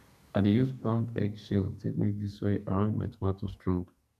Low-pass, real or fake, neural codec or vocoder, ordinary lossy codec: 14.4 kHz; fake; codec, 44.1 kHz, 2.6 kbps, SNAC; none